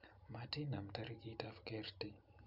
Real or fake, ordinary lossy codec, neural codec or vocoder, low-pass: real; none; none; 5.4 kHz